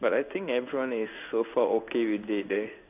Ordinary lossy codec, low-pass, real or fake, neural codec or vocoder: none; 3.6 kHz; fake; codec, 16 kHz in and 24 kHz out, 1 kbps, XY-Tokenizer